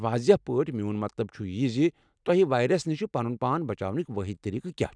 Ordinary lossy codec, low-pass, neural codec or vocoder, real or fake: none; 9.9 kHz; none; real